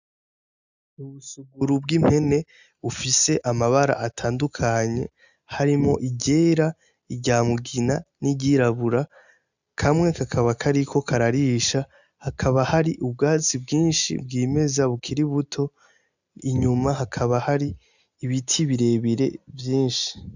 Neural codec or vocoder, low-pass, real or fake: none; 7.2 kHz; real